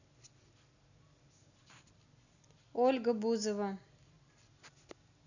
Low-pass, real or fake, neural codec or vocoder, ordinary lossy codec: 7.2 kHz; real; none; none